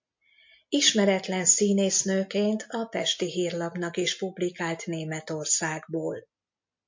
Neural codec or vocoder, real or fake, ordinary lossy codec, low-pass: none; real; MP3, 48 kbps; 7.2 kHz